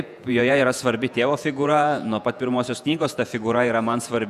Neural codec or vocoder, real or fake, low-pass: vocoder, 48 kHz, 128 mel bands, Vocos; fake; 14.4 kHz